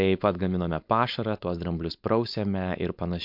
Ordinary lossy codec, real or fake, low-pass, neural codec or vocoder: MP3, 48 kbps; fake; 5.4 kHz; codec, 16 kHz, 4.8 kbps, FACodec